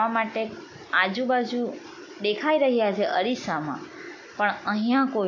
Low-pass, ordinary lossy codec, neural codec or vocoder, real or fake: 7.2 kHz; AAC, 48 kbps; none; real